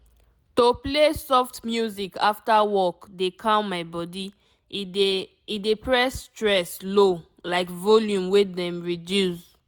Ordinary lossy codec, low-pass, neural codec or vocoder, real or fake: none; none; none; real